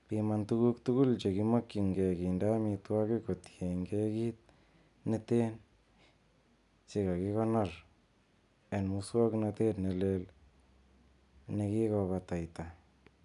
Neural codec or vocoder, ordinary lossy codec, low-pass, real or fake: none; none; 10.8 kHz; real